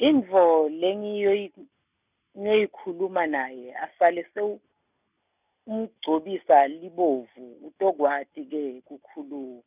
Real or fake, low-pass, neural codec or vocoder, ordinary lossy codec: real; 3.6 kHz; none; none